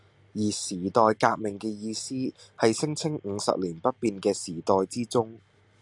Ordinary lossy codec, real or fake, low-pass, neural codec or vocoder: AAC, 64 kbps; real; 10.8 kHz; none